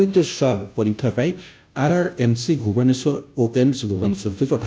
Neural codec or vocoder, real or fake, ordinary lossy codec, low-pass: codec, 16 kHz, 0.5 kbps, FunCodec, trained on Chinese and English, 25 frames a second; fake; none; none